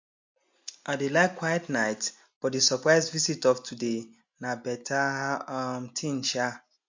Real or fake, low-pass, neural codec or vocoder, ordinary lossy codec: real; 7.2 kHz; none; MP3, 48 kbps